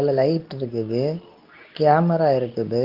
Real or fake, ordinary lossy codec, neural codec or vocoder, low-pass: fake; Opus, 32 kbps; codec, 24 kHz, 0.9 kbps, WavTokenizer, medium speech release version 2; 5.4 kHz